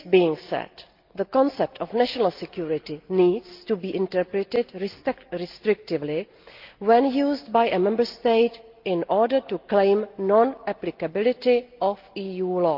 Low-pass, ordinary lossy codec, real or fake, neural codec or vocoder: 5.4 kHz; Opus, 32 kbps; real; none